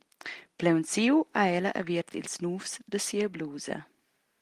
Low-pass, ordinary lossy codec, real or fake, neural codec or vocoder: 14.4 kHz; Opus, 24 kbps; real; none